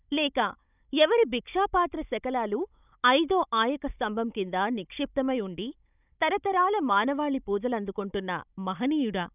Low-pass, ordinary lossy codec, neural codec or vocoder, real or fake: 3.6 kHz; none; codec, 16 kHz, 16 kbps, FunCodec, trained on Chinese and English, 50 frames a second; fake